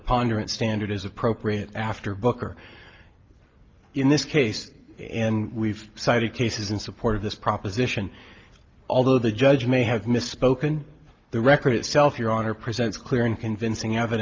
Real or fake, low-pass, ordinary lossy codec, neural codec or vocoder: real; 7.2 kHz; Opus, 32 kbps; none